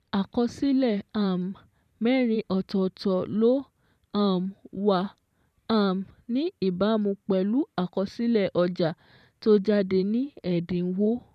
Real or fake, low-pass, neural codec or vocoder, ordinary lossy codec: fake; 14.4 kHz; vocoder, 44.1 kHz, 128 mel bands every 512 samples, BigVGAN v2; none